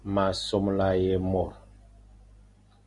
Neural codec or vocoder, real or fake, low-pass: none; real; 10.8 kHz